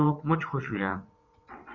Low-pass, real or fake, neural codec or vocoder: 7.2 kHz; fake; vocoder, 22.05 kHz, 80 mel bands, WaveNeXt